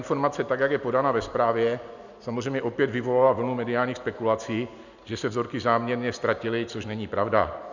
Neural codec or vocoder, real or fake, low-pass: none; real; 7.2 kHz